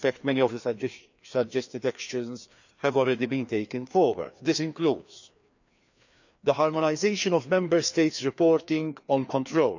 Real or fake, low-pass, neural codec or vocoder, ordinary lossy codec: fake; 7.2 kHz; codec, 16 kHz, 2 kbps, FreqCodec, larger model; none